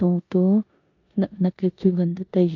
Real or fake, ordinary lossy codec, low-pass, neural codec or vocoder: fake; AAC, 48 kbps; 7.2 kHz; codec, 16 kHz in and 24 kHz out, 0.9 kbps, LongCat-Audio-Codec, four codebook decoder